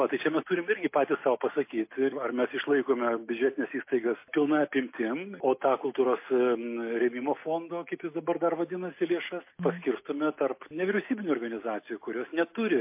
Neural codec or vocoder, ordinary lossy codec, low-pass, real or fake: none; MP3, 24 kbps; 3.6 kHz; real